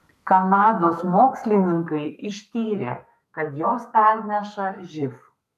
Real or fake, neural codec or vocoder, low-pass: fake; codec, 32 kHz, 1.9 kbps, SNAC; 14.4 kHz